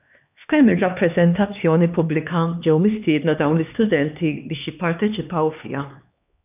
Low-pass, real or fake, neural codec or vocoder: 3.6 kHz; fake; codec, 16 kHz, 2 kbps, X-Codec, HuBERT features, trained on LibriSpeech